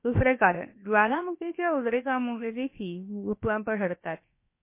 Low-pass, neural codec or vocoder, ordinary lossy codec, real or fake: 3.6 kHz; codec, 16 kHz, about 1 kbps, DyCAST, with the encoder's durations; MP3, 24 kbps; fake